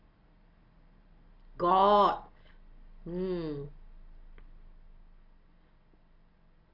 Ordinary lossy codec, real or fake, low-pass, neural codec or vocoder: AAC, 32 kbps; real; 5.4 kHz; none